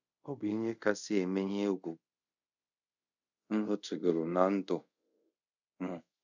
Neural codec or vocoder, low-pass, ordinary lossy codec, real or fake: codec, 24 kHz, 0.5 kbps, DualCodec; 7.2 kHz; none; fake